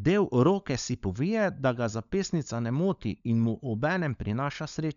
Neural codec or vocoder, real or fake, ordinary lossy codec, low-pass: codec, 16 kHz, 16 kbps, FunCodec, trained on LibriTTS, 50 frames a second; fake; none; 7.2 kHz